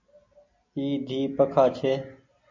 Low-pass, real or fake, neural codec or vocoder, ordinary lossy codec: 7.2 kHz; real; none; MP3, 48 kbps